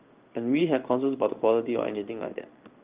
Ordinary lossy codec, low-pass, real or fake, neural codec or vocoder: Opus, 24 kbps; 3.6 kHz; fake; codec, 16 kHz in and 24 kHz out, 1 kbps, XY-Tokenizer